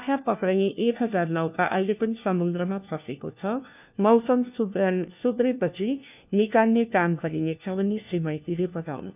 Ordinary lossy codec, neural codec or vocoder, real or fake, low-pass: none; codec, 16 kHz, 1 kbps, FunCodec, trained on LibriTTS, 50 frames a second; fake; 3.6 kHz